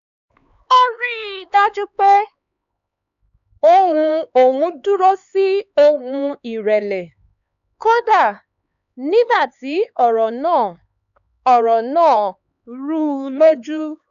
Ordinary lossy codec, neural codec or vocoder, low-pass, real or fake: none; codec, 16 kHz, 4 kbps, X-Codec, HuBERT features, trained on LibriSpeech; 7.2 kHz; fake